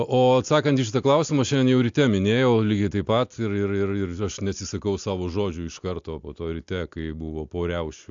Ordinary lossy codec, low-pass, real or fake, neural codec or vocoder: AAC, 64 kbps; 7.2 kHz; real; none